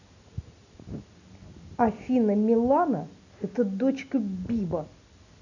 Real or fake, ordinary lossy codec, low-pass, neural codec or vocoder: real; none; 7.2 kHz; none